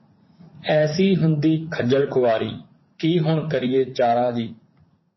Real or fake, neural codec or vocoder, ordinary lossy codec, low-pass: fake; vocoder, 44.1 kHz, 80 mel bands, Vocos; MP3, 24 kbps; 7.2 kHz